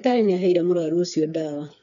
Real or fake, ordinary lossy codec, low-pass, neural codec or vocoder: fake; none; 7.2 kHz; codec, 16 kHz, 4 kbps, FreqCodec, smaller model